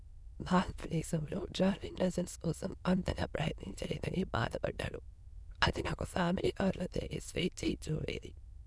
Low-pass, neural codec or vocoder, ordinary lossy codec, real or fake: none; autoencoder, 22.05 kHz, a latent of 192 numbers a frame, VITS, trained on many speakers; none; fake